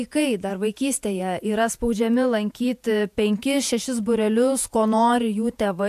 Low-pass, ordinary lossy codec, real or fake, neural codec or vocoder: 14.4 kHz; AAC, 96 kbps; fake; vocoder, 48 kHz, 128 mel bands, Vocos